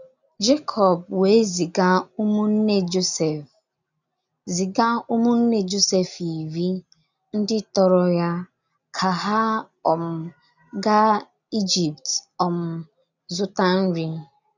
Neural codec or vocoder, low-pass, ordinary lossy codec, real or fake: none; 7.2 kHz; none; real